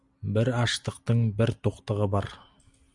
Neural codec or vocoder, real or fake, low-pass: none; real; 10.8 kHz